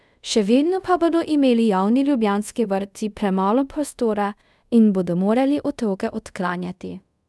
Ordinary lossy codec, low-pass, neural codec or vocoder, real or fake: none; none; codec, 24 kHz, 0.5 kbps, DualCodec; fake